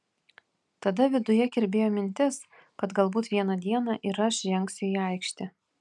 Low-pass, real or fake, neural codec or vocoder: 10.8 kHz; real; none